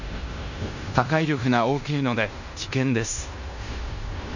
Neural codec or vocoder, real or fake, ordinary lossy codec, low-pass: codec, 16 kHz in and 24 kHz out, 0.9 kbps, LongCat-Audio-Codec, fine tuned four codebook decoder; fake; none; 7.2 kHz